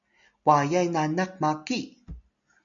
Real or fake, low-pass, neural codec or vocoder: real; 7.2 kHz; none